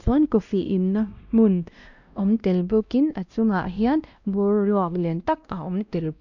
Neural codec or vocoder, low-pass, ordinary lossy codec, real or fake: codec, 16 kHz, 1 kbps, X-Codec, WavLM features, trained on Multilingual LibriSpeech; 7.2 kHz; none; fake